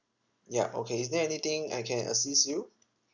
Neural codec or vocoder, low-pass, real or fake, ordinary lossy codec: none; 7.2 kHz; real; none